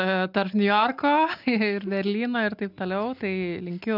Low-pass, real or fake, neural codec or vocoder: 5.4 kHz; real; none